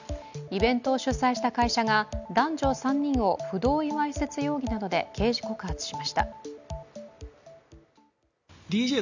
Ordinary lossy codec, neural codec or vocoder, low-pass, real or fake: none; none; 7.2 kHz; real